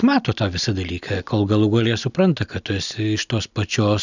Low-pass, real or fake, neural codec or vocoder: 7.2 kHz; real; none